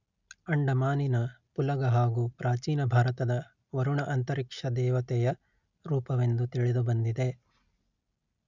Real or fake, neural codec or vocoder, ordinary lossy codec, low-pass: real; none; none; 7.2 kHz